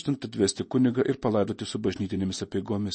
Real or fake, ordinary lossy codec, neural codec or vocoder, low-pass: real; MP3, 32 kbps; none; 10.8 kHz